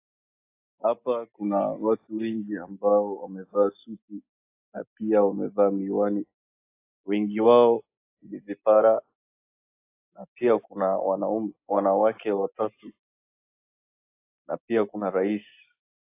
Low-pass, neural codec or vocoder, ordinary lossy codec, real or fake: 3.6 kHz; none; AAC, 24 kbps; real